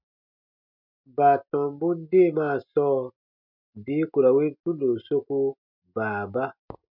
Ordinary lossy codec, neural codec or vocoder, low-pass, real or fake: MP3, 48 kbps; none; 5.4 kHz; real